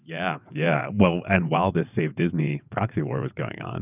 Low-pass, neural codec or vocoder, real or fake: 3.6 kHz; vocoder, 22.05 kHz, 80 mel bands, WaveNeXt; fake